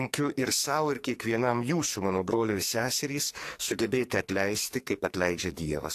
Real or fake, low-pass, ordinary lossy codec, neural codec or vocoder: fake; 14.4 kHz; AAC, 64 kbps; codec, 44.1 kHz, 2.6 kbps, SNAC